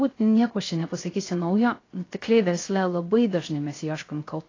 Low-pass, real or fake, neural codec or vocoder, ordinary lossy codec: 7.2 kHz; fake; codec, 16 kHz, 0.3 kbps, FocalCodec; AAC, 32 kbps